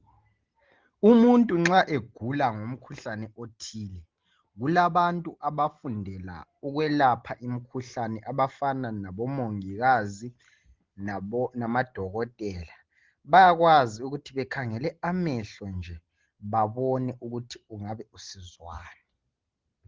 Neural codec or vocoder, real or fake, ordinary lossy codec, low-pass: none; real; Opus, 16 kbps; 7.2 kHz